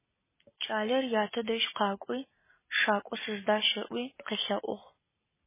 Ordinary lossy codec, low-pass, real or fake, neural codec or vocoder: MP3, 16 kbps; 3.6 kHz; real; none